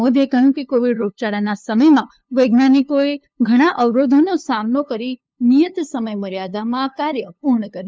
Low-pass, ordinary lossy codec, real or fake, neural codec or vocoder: none; none; fake; codec, 16 kHz, 2 kbps, FunCodec, trained on LibriTTS, 25 frames a second